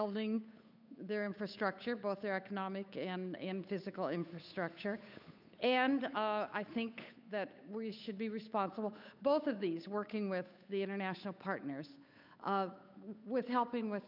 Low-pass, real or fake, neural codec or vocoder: 5.4 kHz; fake; codec, 16 kHz, 8 kbps, FunCodec, trained on Chinese and English, 25 frames a second